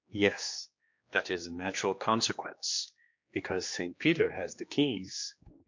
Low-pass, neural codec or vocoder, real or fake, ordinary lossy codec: 7.2 kHz; codec, 16 kHz, 2 kbps, X-Codec, HuBERT features, trained on general audio; fake; MP3, 48 kbps